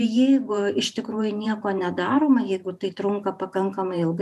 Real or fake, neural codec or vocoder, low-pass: fake; vocoder, 44.1 kHz, 128 mel bands every 512 samples, BigVGAN v2; 14.4 kHz